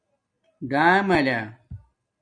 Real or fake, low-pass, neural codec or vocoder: real; 9.9 kHz; none